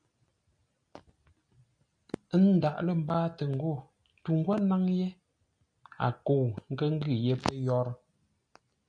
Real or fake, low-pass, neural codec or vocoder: real; 9.9 kHz; none